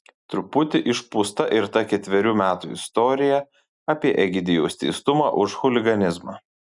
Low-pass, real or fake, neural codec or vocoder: 10.8 kHz; real; none